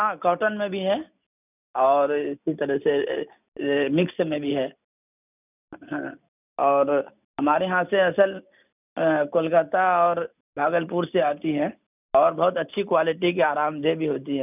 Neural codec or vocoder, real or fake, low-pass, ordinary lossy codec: none; real; 3.6 kHz; none